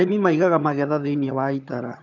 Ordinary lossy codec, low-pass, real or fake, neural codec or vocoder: none; 7.2 kHz; fake; vocoder, 22.05 kHz, 80 mel bands, HiFi-GAN